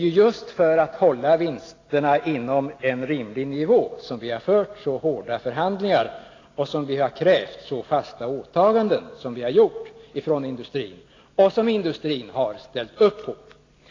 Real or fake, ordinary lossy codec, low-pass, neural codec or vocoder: real; AAC, 32 kbps; 7.2 kHz; none